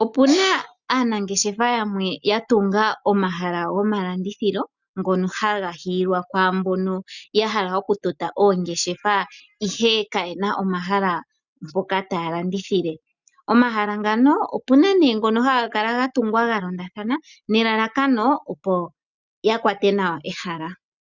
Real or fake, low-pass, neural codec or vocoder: real; 7.2 kHz; none